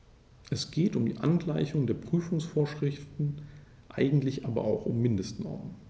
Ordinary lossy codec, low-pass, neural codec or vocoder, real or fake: none; none; none; real